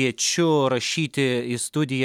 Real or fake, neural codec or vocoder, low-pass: real; none; 19.8 kHz